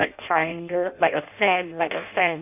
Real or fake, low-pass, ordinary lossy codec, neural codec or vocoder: fake; 3.6 kHz; none; codec, 16 kHz in and 24 kHz out, 0.6 kbps, FireRedTTS-2 codec